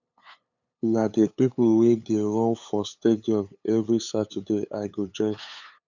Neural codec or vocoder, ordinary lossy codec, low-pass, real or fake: codec, 16 kHz, 8 kbps, FunCodec, trained on LibriTTS, 25 frames a second; none; 7.2 kHz; fake